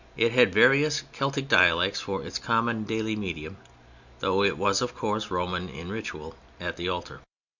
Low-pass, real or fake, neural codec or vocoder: 7.2 kHz; real; none